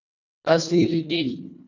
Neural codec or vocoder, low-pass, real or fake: codec, 24 kHz, 1.5 kbps, HILCodec; 7.2 kHz; fake